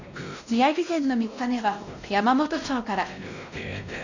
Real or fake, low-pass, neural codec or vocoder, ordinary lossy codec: fake; 7.2 kHz; codec, 16 kHz, 1 kbps, X-Codec, WavLM features, trained on Multilingual LibriSpeech; none